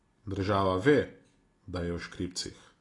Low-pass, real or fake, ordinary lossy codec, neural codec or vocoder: 10.8 kHz; real; AAC, 32 kbps; none